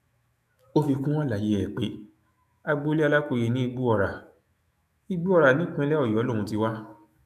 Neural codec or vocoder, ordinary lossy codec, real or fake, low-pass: autoencoder, 48 kHz, 128 numbers a frame, DAC-VAE, trained on Japanese speech; none; fake; 14.4 kHz